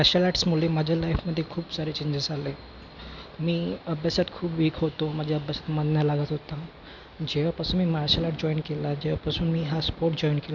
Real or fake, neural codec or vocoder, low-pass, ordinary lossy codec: real; none; 7.2 kHz; none